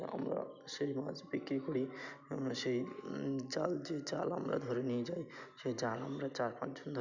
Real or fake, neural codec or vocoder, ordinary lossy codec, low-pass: real; none; none; 7.2 kHz